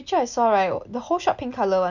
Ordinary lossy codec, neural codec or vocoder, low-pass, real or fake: none; none; 7.2 kHz; real